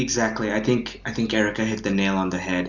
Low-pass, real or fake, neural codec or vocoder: 7.2 kHz; real; none